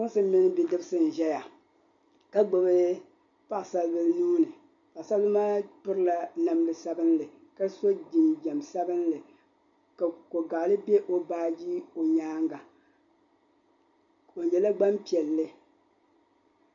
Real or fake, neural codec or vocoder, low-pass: real; none; 7.2 kHz